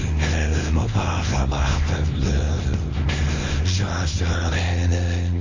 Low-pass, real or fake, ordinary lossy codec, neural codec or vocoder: 7.2 kHz; fake; MP3, 32 kbps; codec, 24 kHz, 0.9 kbps, WavTokenizer, small release